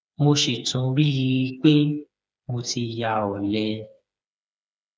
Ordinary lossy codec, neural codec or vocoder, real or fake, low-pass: none; codec, 16 kHz, 4 kbps, FreqCodec, smaller model; fake; none